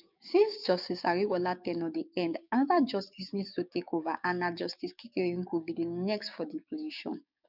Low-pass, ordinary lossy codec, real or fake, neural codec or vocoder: 5.4 kHz; none; fake; codec, 16 kHz, 6 kbps, DAC